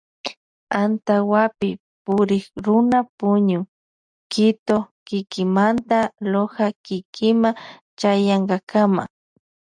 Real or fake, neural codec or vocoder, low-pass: real; none; 9.9 kHz